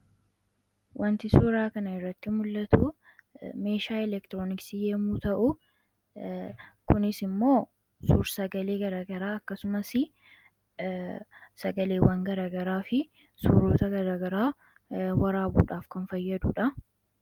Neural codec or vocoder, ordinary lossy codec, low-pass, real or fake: none; Opus, 32 kbps; 19.8 kHz; real